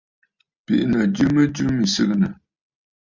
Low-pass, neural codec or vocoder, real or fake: 7.2 kHz; none; real